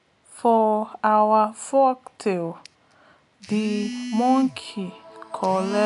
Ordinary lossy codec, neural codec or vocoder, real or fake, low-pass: AAC, 96 kbps; none; real; 10.8 kHz